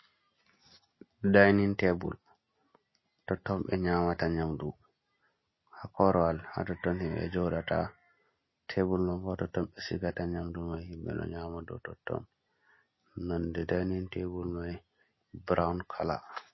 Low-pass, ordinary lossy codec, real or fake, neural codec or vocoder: 7.2 kHz; MP3, 24 kbps; real; none